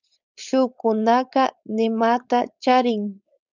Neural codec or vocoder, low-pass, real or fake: codec, 16 kHz, 4.8 kbps, FACodec; 7.2 kHz; fake